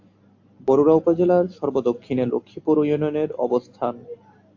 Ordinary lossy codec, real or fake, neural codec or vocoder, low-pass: AAC, 48 kbps; real; none; 7.2 kHz